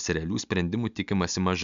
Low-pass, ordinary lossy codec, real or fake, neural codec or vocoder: 7.2 kHz; MP3, 64 kbps; real; none